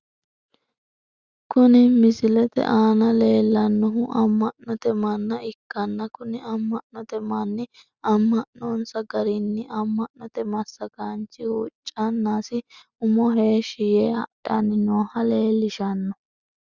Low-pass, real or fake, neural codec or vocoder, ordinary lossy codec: 7.2 kHz; real; none; Opus, 64 kbps